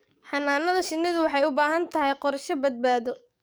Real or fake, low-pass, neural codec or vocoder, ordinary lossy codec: fake; none; codec, 44.1 kHz, 7.8 kbps, DAC; none